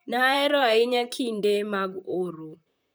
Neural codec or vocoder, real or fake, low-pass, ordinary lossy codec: vocoder, 44.1 kHz, 128 mel bands, Pupu-Vocoder; fake; none; none